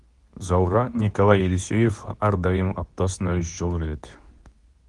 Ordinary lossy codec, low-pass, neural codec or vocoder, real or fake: Opus, 24 kbps; 10.8 kHz; codec, 24 kHz, 0.9 kbps, WavTokenizer, medium speech release version 2; fake